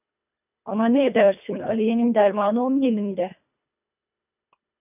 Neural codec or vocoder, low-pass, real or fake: codec, 24 kHz, 1.5 kbps, HILCodec; 3.6 kHz; fake